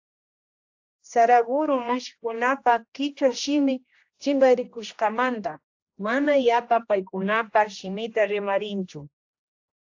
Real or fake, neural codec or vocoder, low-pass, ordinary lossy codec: fake; codec, 16 kHz, 1 kbps, X-Codec, HuBERT features, trained on general audio; 7.2 kHz; AAC, 48 kbps